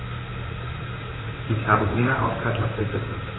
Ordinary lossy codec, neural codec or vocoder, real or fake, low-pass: AAC, 16 kbps; vocoder, 44.1 kHz, 128 mel bands, Pupu-Vocoder; fake; 7.2 kHz